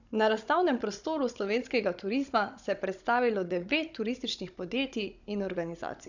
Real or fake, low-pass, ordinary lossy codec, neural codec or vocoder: fake; 7.2 kHz; none; codec, 16 kHz, 16 kbps, FunCodec, trained on Chinese and English, 50 frames a second